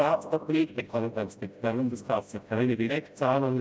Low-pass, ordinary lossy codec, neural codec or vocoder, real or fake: none; none; codec, 16 kHz, 0.5 kbps, FreqCodec, smaller model; fake